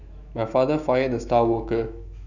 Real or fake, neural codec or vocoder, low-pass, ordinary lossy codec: fake; autoencoder, 48 kHz, 128 numbers a frame, DAC-VAE, trained on Japanese speech; 7.2 kHz; none